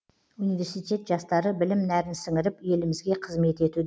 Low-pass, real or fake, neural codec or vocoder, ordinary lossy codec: none; real; none; none